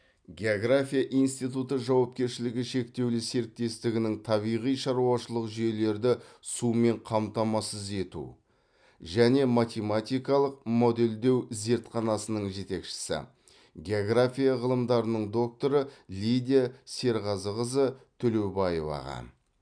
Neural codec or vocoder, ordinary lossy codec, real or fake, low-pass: none; none; real; 9.9 kHz